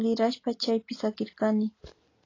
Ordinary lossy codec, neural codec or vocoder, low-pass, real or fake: AAC, 32 kbps; none; 7.2 kHz; real